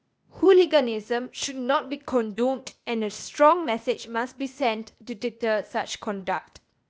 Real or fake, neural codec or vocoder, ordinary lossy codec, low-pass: fake; codec, 16 kHz, 0.8 kbps, ZipCodec; none; none